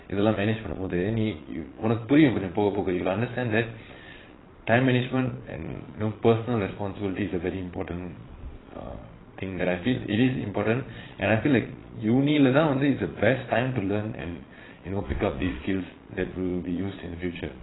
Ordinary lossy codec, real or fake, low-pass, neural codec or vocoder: AAC, 16 kbps; fake; 7.2 kHz; vocoder, 22.05 kHz, 80 mel bands, Vocos